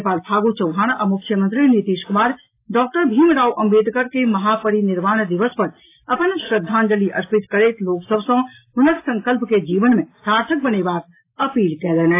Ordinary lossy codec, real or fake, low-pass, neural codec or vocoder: AAC, 24 kbps; real; 3.6 kHz; none